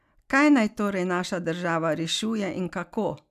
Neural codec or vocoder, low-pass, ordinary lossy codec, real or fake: none; 14.4 kHz; none; real